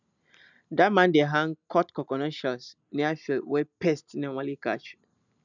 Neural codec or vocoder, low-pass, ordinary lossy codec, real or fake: none; 7.2 kHz; none; real